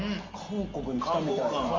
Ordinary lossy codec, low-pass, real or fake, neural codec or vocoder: Opus, 32 kbps; 7.2 kHz; real; none